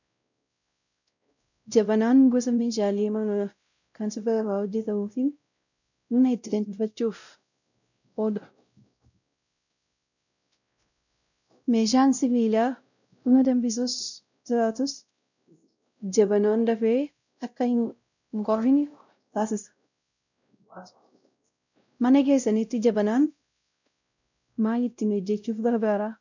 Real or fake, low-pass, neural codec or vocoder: fake; 7.2 kHz; codec, 16 kHz, 0.5 kbps, X-Codec, WavLM features, trained on Multilingual LibriSpeech